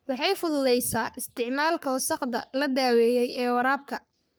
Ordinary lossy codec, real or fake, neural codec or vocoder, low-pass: none; fake; codec, 44.1 kHz, 3.4 kbps, Pupu-Codec; none